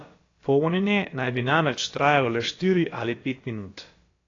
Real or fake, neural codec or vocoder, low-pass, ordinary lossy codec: fake; codec, 16 kHz, about 1 kbps, DyCAST, with the encoder's durations; 7.2 kHz; AAC, 32 kbps